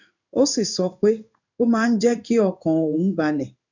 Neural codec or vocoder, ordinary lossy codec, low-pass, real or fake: codec, 16 kHz in and 24 kHz out, 1 kbps, XY-Tokenizer; none; 7.2 kHz; fake